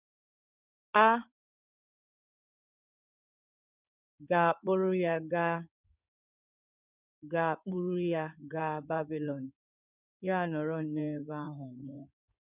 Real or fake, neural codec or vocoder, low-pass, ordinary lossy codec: fake; codec, 16 kHz in and 24 kHz out, 2.2 kbps, FireRedTTS-2 codec; 3.6 kHz; none